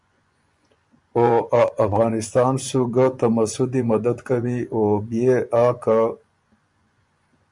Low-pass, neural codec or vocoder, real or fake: 10.8 kHz; vocoder, 24 kHz, 100 mel bands, Vocos; fake